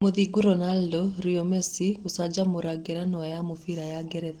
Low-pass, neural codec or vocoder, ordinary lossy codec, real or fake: 19.8 kHz; none; Opus, 16 kbps; real